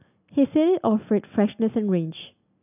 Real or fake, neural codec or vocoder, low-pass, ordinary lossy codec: real; none; 3.6 kHz; none